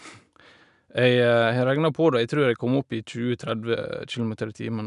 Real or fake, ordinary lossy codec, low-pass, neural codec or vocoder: real; none; 10.8 kHz; none